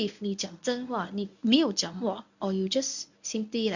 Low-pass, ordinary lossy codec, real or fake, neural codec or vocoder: 7.2 kHz; none; fake; codec, 24 kHz, 0.9 kbps, WavTokenizer, medium speech release version 1